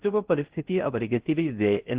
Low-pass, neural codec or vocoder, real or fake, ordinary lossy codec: 3.6 kHz; codec, 16 kHz in and 24 kHz out, 0.6 kbps, FocalCodec, streaming, 2048 codes; fake; Opus, 16 kbps